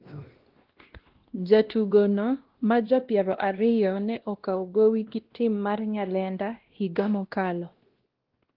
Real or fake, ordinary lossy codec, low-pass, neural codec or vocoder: fake; Opus, 16 kbps; 5.4 kHz; codec, 16 kHz, 1 kbps, X-Codec, WavLM features, trained on Multilingual LibriSpeech